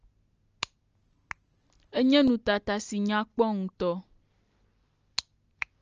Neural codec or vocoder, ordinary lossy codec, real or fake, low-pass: none; Opus, 24 kbps; real; 7.2 kHz